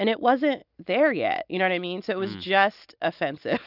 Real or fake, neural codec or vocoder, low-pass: real; none; 5.4 kHz